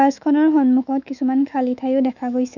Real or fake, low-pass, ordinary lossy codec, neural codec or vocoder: fake; 7.2 kHz; none; autoencoder, 48 kHz, 128 numbers a frame, DAC-VAE, trained on Japanese speech